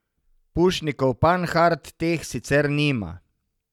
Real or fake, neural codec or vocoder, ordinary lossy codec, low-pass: real; none; none; 19.8 kHz